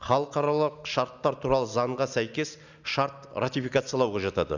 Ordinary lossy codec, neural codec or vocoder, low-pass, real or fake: none; none; 7.2 kHz; real